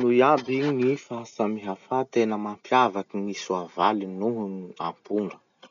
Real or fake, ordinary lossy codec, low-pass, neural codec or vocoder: real; none; 7.2 kHz; none